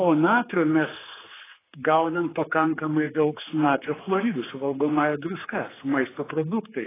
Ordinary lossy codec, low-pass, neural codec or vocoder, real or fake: AAC, 16 kbps; 3.6 kHz; codec, 16 kHz, 4 kbps, X-Codec, HuBERT features, trained on general audio; fake